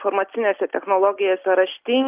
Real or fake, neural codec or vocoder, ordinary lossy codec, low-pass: real; none; Opus, 32 kbps; 3.6 kHz